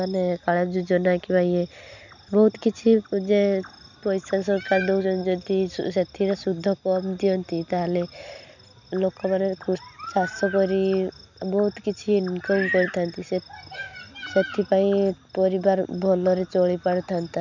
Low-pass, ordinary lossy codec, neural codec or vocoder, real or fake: 7.2 kHz; none; none; real